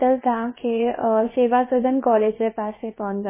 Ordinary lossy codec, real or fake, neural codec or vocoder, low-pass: MP3, 16 kbps; fake; codec, 16 kHz, 0.7 kbps, FocalCodec; 3.6 kHz